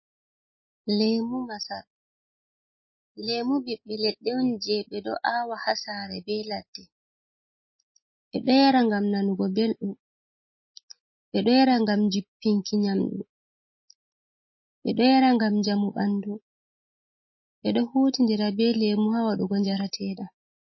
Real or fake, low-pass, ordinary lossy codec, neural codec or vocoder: real; 7.2 kHz; MP3, 24 kbps; none